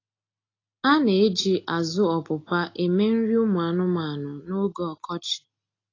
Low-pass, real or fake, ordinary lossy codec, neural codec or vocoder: 7.2 kHz; real; AAC, 32 kbps; none